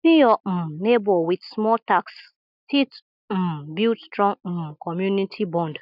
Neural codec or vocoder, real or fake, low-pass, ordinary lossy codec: none; real; 5.4 kHz; none